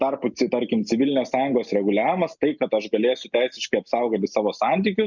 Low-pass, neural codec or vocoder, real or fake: 7.2 kHz; none; real